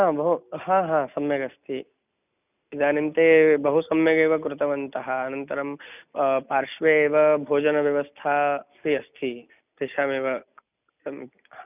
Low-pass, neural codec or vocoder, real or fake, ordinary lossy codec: 3.6 kHz; none; real; none